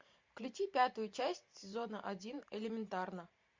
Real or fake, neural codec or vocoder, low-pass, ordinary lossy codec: fake; vocoder, 44.1 kHz, 128 mel bands every 512 samples, BigVGAN v2; 7.2 kHz; MP3, 48 kbps